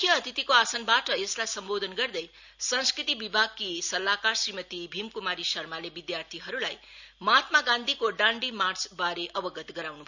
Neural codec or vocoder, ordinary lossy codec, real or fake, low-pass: none; none; real; 7.2 kHz